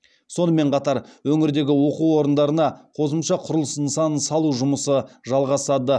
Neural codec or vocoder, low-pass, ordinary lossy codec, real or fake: none; none; none; real